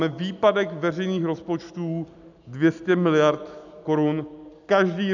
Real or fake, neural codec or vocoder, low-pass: real; none; 7.2 kHz